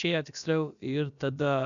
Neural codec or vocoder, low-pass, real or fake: codec, 16 kHz, about 1 kbps, DyCAST, with the encoder's durations; 7.2 kHz; fake